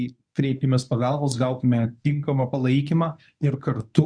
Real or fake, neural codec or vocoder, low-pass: fake; codec, 24 kHz, 0.9 kbps, WavTokenizer, medium speech release version 1; 9.9 kHz